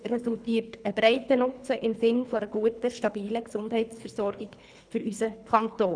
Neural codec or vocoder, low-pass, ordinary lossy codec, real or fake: codec, 24 kHz, 3 kbps, HILCodec; 9.9 kHz; none; fake